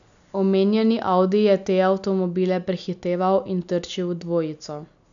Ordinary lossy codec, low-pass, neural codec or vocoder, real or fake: none; 7.2 kHz; none; real